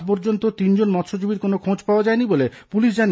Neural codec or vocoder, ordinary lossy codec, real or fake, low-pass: none; none; real; none